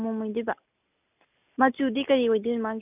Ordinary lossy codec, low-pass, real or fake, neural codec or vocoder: none; 3.6 kHz; real; none